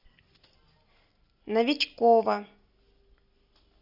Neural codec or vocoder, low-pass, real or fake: none; 5.4 kHz; real